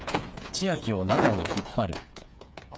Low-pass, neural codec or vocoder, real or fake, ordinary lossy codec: none; codec, 16 kHz, 8 kbps, FreqCodec, smaller model; fake; none